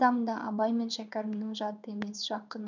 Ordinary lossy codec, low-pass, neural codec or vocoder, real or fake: none; 7.2 kHz; codec, 16 kHz in and 24 kHz out, 1 kbps, XY-Tokenizer; fake